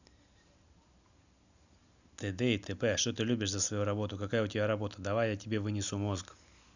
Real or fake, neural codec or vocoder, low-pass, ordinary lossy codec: real; none; 7.2 kHz; none